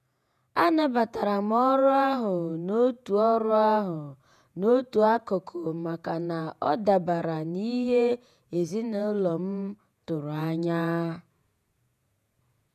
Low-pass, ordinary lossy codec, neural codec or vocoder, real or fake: 14.4 kHz; none; vocoder, 48 kHz, 128 mel bands, Vocos; fake